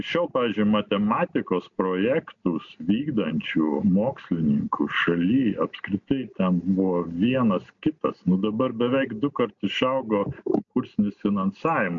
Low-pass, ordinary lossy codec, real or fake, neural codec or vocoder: 7.2 kHz; MP3, 64 kbps; real; none